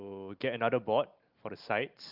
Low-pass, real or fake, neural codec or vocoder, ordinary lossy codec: 5.4 kHz; real; none; Opus, 32 kbps